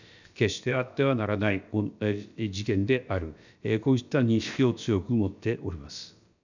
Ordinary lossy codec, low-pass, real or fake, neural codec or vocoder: none; 7.2 kHz; fake; codec, 16 kHz, about 1 kbps, DyCAST, with the encoder's durations